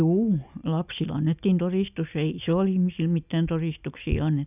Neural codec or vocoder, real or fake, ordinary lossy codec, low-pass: none; real; none; 3.6 kHz